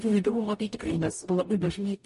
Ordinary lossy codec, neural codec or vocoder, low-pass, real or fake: MP3, 48 kbps; codec, 44.1 kHz, 0.9 kbps, DAC; 14.4 kHz; fake